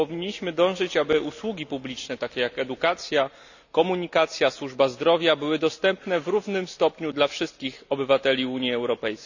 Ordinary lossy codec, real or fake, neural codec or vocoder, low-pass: none; real; none; 7.2 kHz